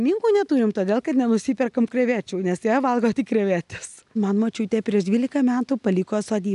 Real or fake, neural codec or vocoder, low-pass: real; none; 10.8 kHz